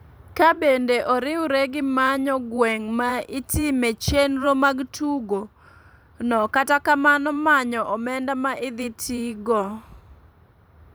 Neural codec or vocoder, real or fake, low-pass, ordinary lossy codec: vocoder, 44.1 kHz, 128 mel bands every 512 samples, BigVGAN v2; fake; none; none